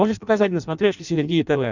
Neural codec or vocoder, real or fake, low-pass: codec, 16 kHz in and 24 kHz out, 0.6 kbps, FireRedTTS-2 codec; fake; 7.2 kHz